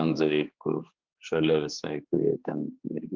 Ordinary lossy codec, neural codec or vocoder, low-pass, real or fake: Opus, 24 kbps; codec, 16 kHz, 4 kbps, X-Codec, HuBERT features, trained on general audio; 7.2 kHz; fake